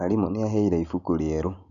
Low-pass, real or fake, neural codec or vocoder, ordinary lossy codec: 7.2 kHz; real; none; none